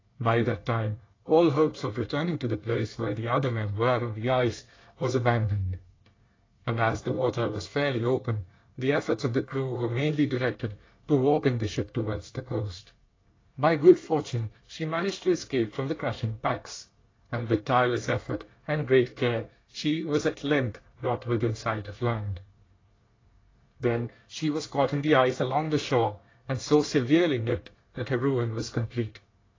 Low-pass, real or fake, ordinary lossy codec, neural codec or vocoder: 7.2 kHz; fake; AAC, 32 kbps; codec, 24 kHz, 1 kbps, SNAC